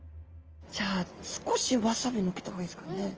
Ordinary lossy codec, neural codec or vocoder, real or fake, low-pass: Opus, 24 kbps; none; real; 7.2 kHz